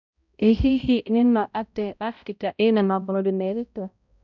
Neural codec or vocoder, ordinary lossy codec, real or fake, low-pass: codec, 16 kHz, 0.5 kbps, X-Codec, HuBERT features, trained on balanced general audio; none; fake; 7.2 kHz